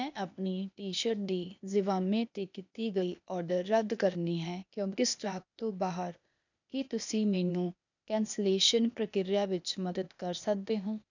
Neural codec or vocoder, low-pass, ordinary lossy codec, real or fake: codec, 16 kHz, 0.8 kbps, ZipCodec; 7.2 kHz; none; fake